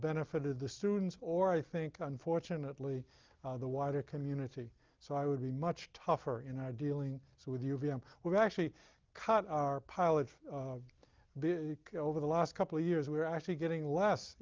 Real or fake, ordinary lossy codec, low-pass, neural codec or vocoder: real; Opus, 24 kbps; 7.2 kHz; none